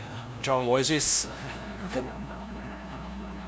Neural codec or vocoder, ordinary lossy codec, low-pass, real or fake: codec, 16 kHz, 0.5 kbps, FunCodec, trained on LibriTTS, 25 frames a second; none; none; fake